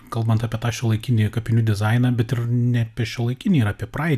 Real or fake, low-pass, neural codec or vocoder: real; 14.4 kHz; none